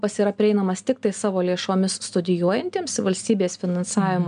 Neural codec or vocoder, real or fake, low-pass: none; real; 9.9 kHz